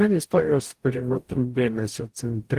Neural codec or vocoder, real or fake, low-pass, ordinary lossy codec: codec, 44.1 kHz, 0.9 kbps, DAC; fake; 14.4 kHz; Opus, 24 kbps